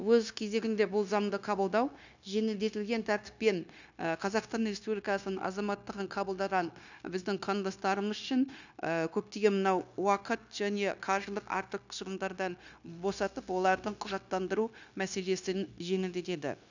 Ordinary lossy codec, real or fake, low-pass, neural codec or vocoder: none; fake; 7.2 kHz; codec, 16 kHz, 0.9 kbps, LongCat-Audio-Codec